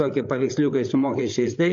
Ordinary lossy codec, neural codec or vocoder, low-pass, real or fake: MP3, 48 kbps; codec, 16 kHz, 8 kbps, FreqCodec, larger model; 7.2 kHz; fake